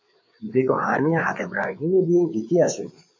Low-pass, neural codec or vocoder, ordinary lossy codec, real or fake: 7.2 kHz; codec, 16 kHz, 4 kbps, FreqCodec, larger model; MP3, 48 kbps; fake